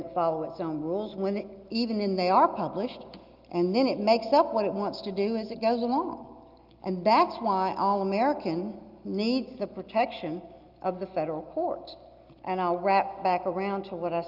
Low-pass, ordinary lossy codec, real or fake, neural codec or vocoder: 5.4 kHz; Opus, 32 kbps; real; none